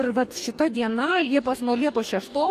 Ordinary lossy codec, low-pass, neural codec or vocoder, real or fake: AAC, 64 kbps; 14.4 kHz; codec, 44.1 kHz, 2.6 kbps, DAC; fake